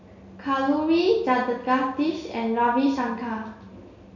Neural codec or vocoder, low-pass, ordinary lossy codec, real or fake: none; 7.2 kHz; none; real